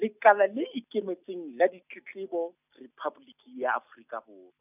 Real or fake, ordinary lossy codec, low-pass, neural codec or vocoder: real; none; 3.6 kHz; none